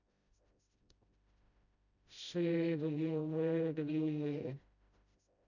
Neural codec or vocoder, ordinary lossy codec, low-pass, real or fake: codec, 16 kHz, 0.5 kbps, FreqCodec, smaller model; none; 7.2 kHz; fake